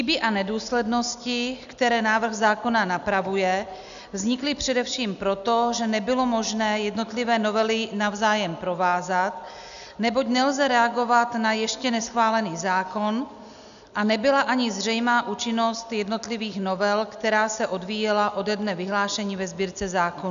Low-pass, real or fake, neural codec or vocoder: 7.2 kHz; real; none